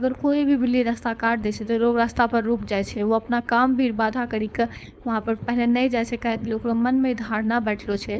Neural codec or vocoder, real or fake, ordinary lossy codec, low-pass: codec, 16 kHz, 4.8 kbps, FACodec; fake; none; none